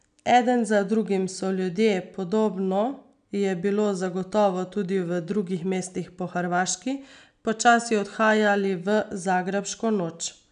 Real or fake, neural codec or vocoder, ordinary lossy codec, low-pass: real; none; none; 9.9 kHz